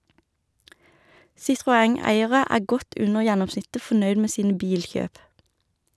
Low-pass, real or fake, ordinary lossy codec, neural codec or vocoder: none; real; none; none